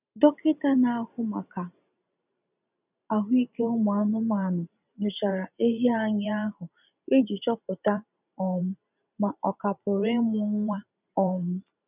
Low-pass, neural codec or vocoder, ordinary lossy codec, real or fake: 3.6 kHz; vocoder, 44.1 kHz, 128 mel bands every 512 samples, BigVGAN v2; none; fake